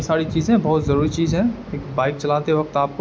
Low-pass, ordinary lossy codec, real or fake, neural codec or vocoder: none; none; real; none